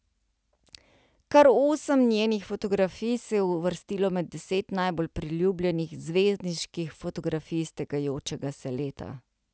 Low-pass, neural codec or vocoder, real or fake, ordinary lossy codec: none; none; real; none